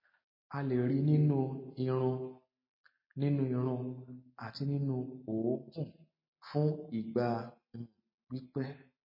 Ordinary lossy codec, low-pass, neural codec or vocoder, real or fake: MP3, 24 kbps; 5.4 kHz; none; real